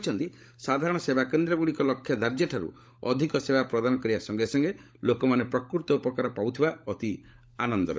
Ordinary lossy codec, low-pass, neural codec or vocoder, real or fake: none; none; codec, 16 kHz, 16 kbps, FunCodec, trained on LibriTTS, 50 frames a second; fake